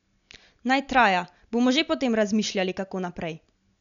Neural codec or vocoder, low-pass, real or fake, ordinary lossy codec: none; 7.2 kHz; real; none